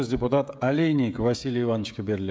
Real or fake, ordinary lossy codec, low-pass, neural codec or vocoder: fake; none; none; codec, 16 kHz, 16 kbps, FreqCodec, smaller model